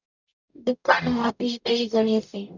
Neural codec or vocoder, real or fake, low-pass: codec, 44.1 kHz, 0.9 kbps, DAC; fake; 7.2 kHz